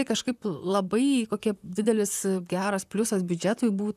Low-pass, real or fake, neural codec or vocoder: 14.4 kHz; fake; vocoder, 44.1 kHz, 128 mel bands, Pupu-Vocoder